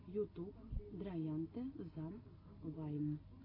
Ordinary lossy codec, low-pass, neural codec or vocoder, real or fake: AAC, 24 kbps; 5.4 kHz; none; real